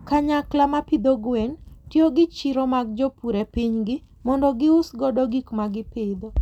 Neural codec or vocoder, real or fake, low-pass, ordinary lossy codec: none; real; 19.8 kHz; none